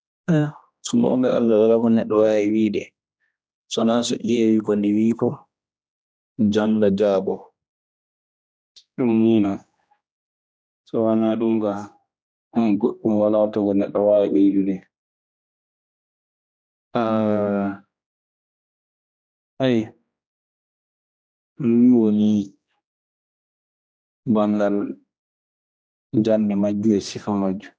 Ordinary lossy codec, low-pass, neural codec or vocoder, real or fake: none; none; codec, 16 kHz, 1 kbps, X-Codec, HuBERT features, trained on general audio; fake